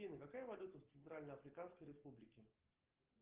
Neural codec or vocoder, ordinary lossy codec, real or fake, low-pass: none; Opus, 16 kbps; real; 3.6 kHz